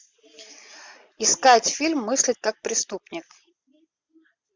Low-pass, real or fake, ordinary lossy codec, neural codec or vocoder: 7.2 kHz; real; MP3, 64 kbps; none